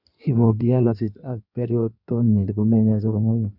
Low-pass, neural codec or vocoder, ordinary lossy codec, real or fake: 5.4 kHz; codec, 16 kHz in and 24 kHz out, 1.1 kbps, FireRedTTS-2 codec; none; fake